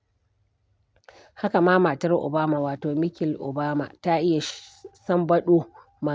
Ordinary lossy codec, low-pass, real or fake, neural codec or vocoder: none; none; real; none